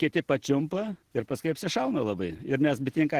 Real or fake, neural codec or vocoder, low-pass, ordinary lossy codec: real; none; 14.4 kHz; Opus, 16 kbps